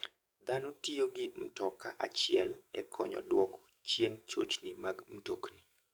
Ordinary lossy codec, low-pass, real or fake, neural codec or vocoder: none; none; fake; codec, 44.1 kHz, 7.8 kbps, DAC